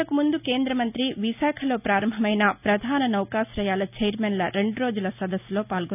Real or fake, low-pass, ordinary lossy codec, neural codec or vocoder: real; 3.6 kHz; none; none